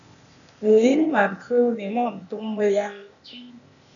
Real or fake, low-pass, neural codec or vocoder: fake; 7.2 kHz; codec, 16 kHz, 0.8 kbps, ZipCodec